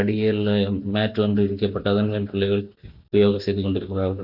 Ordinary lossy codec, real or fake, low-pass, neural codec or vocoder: none; real; 5.4 kHz; none